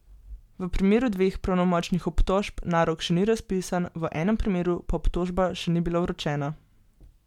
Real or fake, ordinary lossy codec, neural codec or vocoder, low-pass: real; MP3, 96 kbps; none; 19.8 kHz